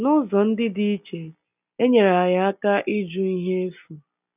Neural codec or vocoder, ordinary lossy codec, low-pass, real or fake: none; none; 3.6 kHz; real